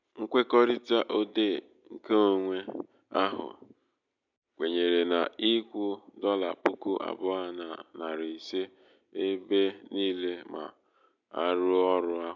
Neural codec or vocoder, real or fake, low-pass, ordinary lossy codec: none; real; 7.2 kHz; none